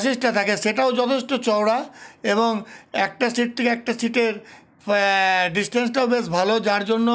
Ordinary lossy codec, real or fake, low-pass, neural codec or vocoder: none; real; none; none